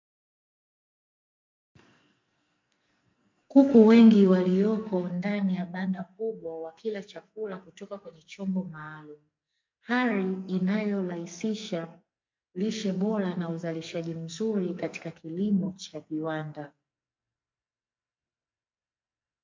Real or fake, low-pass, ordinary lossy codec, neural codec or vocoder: fake; 7.2 kHz; MP3, 48 kbps; codec, 44.1 kHz, 2.6 kbps, SNAC